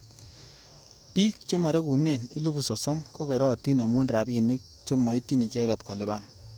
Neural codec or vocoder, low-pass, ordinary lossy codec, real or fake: codec, 44.1 kHz, 2.6 kbps, DAC; none; none; fake